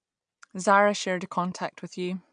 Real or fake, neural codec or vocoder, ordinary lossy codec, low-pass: real; none; MP3, 96 kbps; 9.9 kHz